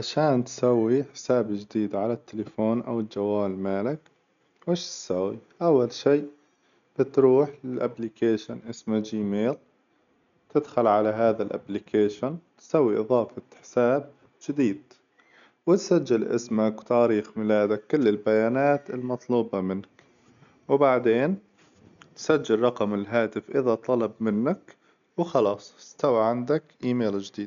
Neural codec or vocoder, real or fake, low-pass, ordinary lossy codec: none; real; 7.2 kHz; none